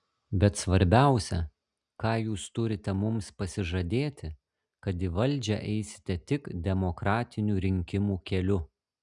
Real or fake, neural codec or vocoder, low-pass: real; none; 10.8 kHz